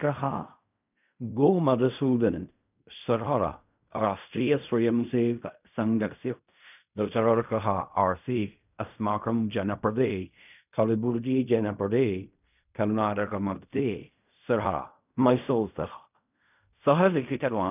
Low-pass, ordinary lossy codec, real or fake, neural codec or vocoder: 3.6 kHz; none; fake; codec, 16 kHz in and 24 kHz out, 0.4 kbps, LongCat-Audio-Codec, fine tuned four codebook decoder